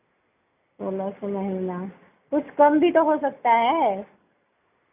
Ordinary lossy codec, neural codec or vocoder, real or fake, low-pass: none; none; real; 3.6 kHz